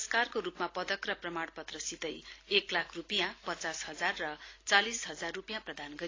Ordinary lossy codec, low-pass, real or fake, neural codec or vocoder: AAC, 32 kbps; 7.2 kHz; real; none